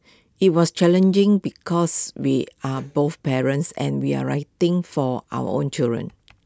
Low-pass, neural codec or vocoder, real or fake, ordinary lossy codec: none; none; real; none